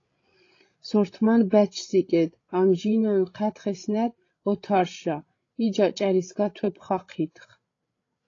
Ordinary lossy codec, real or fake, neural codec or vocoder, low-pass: AAC, 32 kbps; fake; codec, 16 kHz, 16 kbps, FreqCodec, larger model; 7.2 kHz